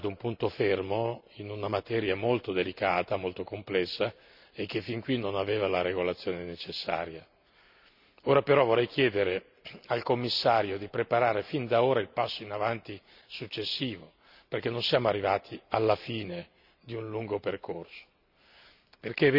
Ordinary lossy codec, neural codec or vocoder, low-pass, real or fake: none; none; 5.4 kHz; real